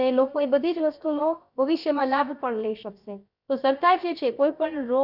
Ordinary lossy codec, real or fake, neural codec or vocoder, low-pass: none; fake; codec, 16 kHz, about 1 kbps, DyCAST, with the encoder's durations; 5.4 kHz